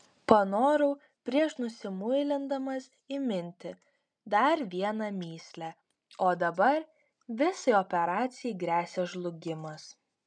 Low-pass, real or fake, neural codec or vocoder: 9.9 kHz; real; none